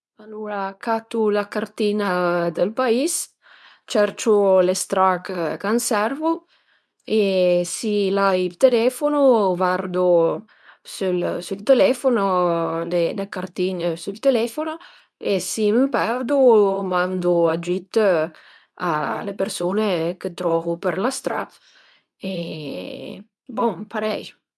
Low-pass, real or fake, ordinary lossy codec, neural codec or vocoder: none; fake; none; codec, 24 kHz, 0.9 kbps, WavTokenizer, medium speech release version 2